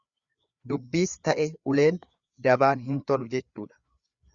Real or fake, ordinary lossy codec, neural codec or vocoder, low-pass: fake; Opus, 64 kbps; codec, 16 kHz, 4 kbps, FreqCodec, larger model; 7.2 kHz